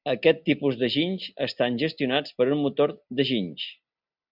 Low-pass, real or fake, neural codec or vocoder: 5.4 kHz; real; none